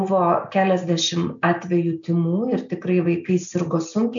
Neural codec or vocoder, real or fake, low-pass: none; real; 7.2 kHz